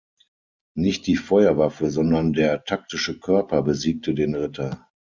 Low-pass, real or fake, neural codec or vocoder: 7.2 kHz; real; none